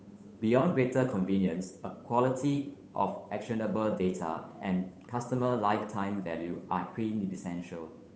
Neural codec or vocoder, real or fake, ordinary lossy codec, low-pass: codec, 16 kHz, 8 kbps, FunCodec, trained on Chinese and English, 25 frames a second; fake; none; none